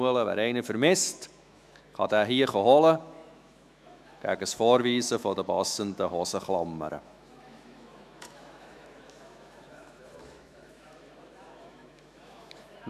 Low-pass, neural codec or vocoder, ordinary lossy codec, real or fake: 14.4 kHz; autoencoder, 48 kHz, 128 numbers a frame, DAC-VAE, trained on Japanese speech; none; fake